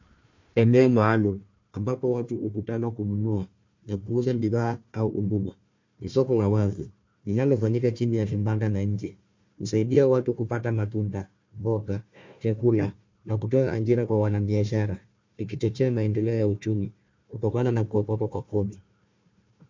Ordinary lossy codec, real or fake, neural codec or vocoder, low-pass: MP3, 48 kbps; fake; codec, 16 kHz, 1 kbps, FunCodec, trained on Chinese and English, 50 frames a second; 7.2 kHz